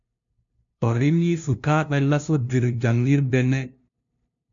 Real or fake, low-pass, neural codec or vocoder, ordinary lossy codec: fake; 7.2 kHz; codec, 16 kHz, 0.5 kbps, FunCodec, trained on LibriTTS, 25 frames a second; MP3, 64 kbps